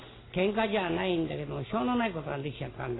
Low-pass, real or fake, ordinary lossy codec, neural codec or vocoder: 7.2 kHz; real; AAC, 16 kbps; none